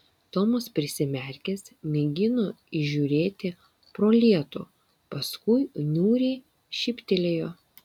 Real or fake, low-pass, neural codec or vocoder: real; 19.8 kHz; none